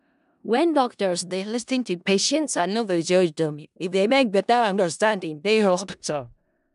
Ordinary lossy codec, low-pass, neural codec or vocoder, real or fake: none; 10.8 kHz; codec, 16 kHz in and 24 kHz out, 0.4 kbps, LongCat-Audio-Codec, four codebook decoder; fake